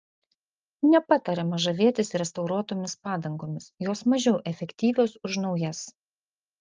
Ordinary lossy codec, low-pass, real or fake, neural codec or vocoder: Opus, 24 kbps; 7.2 kHz; real; none